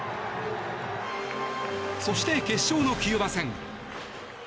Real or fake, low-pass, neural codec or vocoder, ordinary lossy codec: real; none; none; none